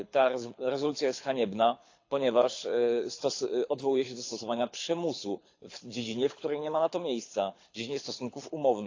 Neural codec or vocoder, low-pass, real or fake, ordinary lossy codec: codec, 24 kHz, 6 kbps, HILCodec; 7.2 kHz; fake; AAC, 48 kbps